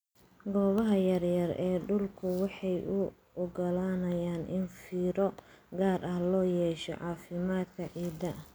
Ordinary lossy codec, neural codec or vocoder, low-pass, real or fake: none; none; none; real